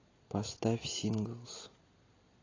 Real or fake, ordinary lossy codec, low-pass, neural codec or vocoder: real; AAC, 32 kbps; 7.2 kHz; none